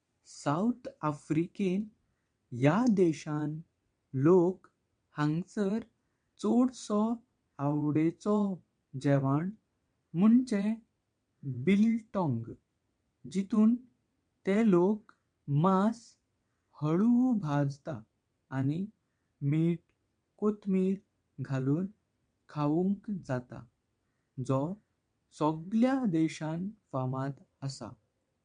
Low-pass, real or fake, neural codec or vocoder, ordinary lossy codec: 9.9 kHz; fake; vocoder, 22.05 kHz, 80 mel bands, WaveNeXt; MP3, 64 kbps